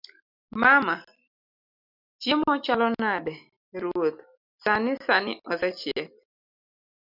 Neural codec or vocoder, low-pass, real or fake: none; 5.4 kHz; real